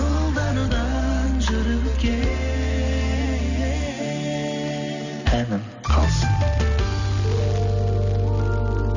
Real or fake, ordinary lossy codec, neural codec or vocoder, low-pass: real; none; none; 7.2 kHz